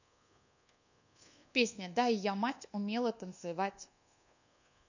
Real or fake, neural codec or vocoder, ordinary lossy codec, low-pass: fake; codec, 24 kHz, 1.2 kbps, DualCodec; none; 7.2 kHz